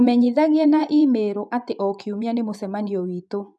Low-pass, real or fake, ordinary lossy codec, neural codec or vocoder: none; fake; none; vocoder, 24 kHz, 100 mel bands, Vocos